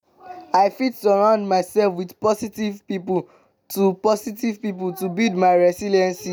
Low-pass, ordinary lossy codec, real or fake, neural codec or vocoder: none; none; real; none